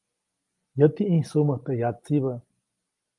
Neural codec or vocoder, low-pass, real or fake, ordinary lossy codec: none; 10.8 kHz; real; Opus, 32 kbps